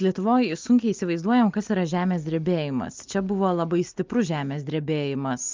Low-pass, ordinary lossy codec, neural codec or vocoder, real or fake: 7.2 kHz; Opus, 32 kbps; none; real